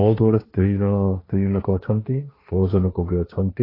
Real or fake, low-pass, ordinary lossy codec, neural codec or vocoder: fake; 5.4 kHz; AAC, 24 kbps; codec, 16 kHz, 1.1 kbps, Voila-Tokenizer